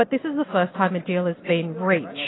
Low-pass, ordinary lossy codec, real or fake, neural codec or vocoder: 7.2 kHz; AAC, 16 kbps; real; none